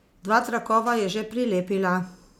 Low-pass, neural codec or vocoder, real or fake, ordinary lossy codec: 19.8 kHz; none; real; none